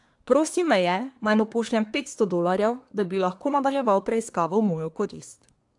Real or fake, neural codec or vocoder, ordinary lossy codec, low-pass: fake; codec, 24 kHz, 1 kbps, SNAC; AAC, 64 kbps; 10.8 kHz